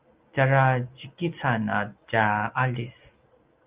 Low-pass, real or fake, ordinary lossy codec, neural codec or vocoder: 3.6 kHz; real; Opus, 24 kbps; none